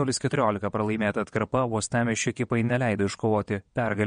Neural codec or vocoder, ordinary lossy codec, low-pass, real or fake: vocoder, 22.05 kHz, 80 mel bands, WaveNeXt; MP3, 48 kbps; 9.9 kHz; fake